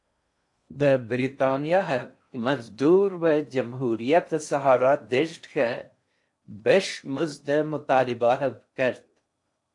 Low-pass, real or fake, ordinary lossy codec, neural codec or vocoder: 10.8 kHz; fake; AAC, 64 kbps; codec, 16 kHz in and 24 kHz out, 0.8 kbps, FocalCodec, streaming, 65536 codes